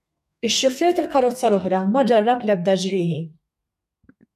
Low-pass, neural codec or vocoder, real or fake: 14.4 kHz; codec, 32 kHz, 1.9 kbps, SNAC; fake